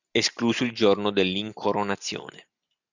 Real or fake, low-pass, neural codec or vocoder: real; 7.2 kHz; none